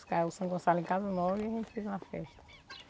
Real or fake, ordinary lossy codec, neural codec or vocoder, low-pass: real; none; none; none